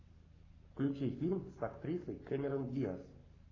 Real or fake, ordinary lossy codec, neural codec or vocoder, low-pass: fake; AAC, 32 kbps; codec, 44.1 kHz, 7.8 kbps, Pupu-Codec; 7.2 kHz